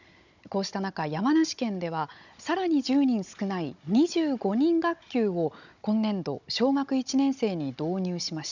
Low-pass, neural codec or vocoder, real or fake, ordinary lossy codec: 7.2 kHz; codec, 16 kHz, 16 kbps, FunCodec, trained on Chinese and English, 50 frames a second; fake; none